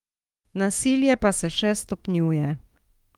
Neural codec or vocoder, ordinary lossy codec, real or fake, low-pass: autoencoder, 48 kHz, 32 numbers a frame, DAC-VAE, trained on Japanese speech; Opus, 32 kbps; fake; 19.8 kHz